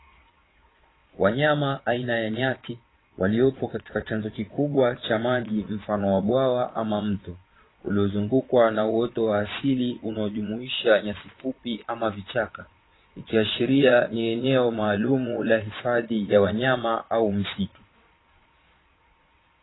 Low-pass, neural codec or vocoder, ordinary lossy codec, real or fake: 7.2 kHz; vocoder, 22.05 kHz, 80 mel bands, Vocos; AAC, 16 kbps; fake